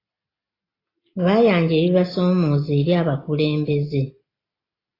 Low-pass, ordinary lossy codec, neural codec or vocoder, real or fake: 5.4 kHz; AAC, 24 kbps; none; real